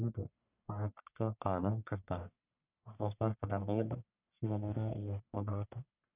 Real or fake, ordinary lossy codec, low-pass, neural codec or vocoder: fake; none; 3.6 kHz; codec, 44.1 kHz, 1.7 kbps, Pupu-Codec